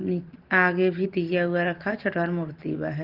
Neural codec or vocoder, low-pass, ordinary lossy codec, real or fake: none; 5.4 kHz; Opus, 16 kbps; real